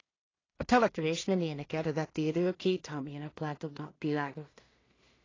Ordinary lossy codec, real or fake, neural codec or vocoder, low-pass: AAC, 32 kbps; fake; codec, 16 kHz in and 24 kHz out, 0.4 kbps, LongCat-Audio-Codec, two codebook decoder; 7.2 kHz